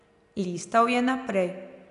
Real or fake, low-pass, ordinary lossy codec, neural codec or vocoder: real; 10.8 kHz; none; none